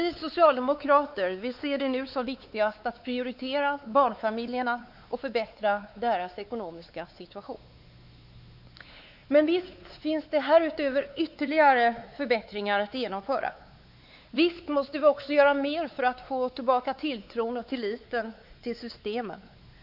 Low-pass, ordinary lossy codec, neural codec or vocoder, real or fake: 5.4 kHz; none; codec, 16 kHz, 4 kbps, X-Codec, WavLM features, trained on Multilingual LibriSpeech; fake